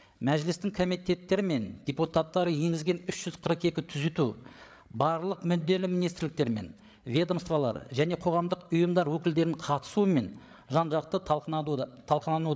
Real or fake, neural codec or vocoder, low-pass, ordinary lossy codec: fake; codec, 16 kHz, 8 kbps, FreqCodec, larger model; none; none